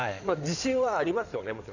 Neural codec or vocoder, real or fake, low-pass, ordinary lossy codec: codec, 16 kHz in and 24 kHz out, 2.2 kbps, FireRedTTS-2 codec; fake; 7.2 kHz; none